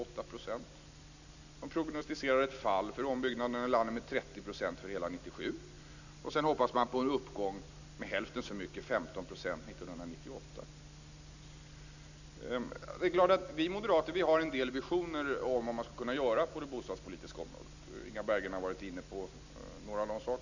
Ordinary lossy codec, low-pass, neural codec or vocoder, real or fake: none; 7.2 kHz; none; real